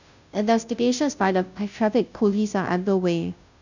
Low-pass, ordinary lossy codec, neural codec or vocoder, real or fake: 7.2 kHz; none; codec, 16 kHz, 0.5 kbps, FunCodec, trained on Chinese and English, 25 frames a second; fake